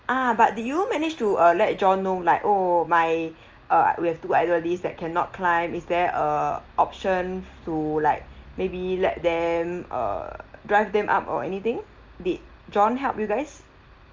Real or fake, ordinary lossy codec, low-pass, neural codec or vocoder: real; Opus, 24 kbps; 7.2 kHz; none